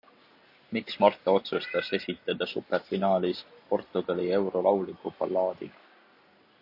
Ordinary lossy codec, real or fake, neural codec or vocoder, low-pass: AAC, 48 kbps; real; none; 5.4 kHz